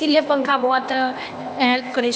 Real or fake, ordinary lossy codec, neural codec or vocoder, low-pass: fake; none; codec, 16 kHz, 0.8 kbps, ZipCodec; none